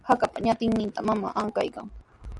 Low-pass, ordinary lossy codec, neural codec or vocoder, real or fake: 10.8 kHz; Opus, 64 kbps; none; real